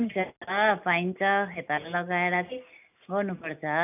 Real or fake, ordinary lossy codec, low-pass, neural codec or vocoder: real; none; 3.6 kHz; none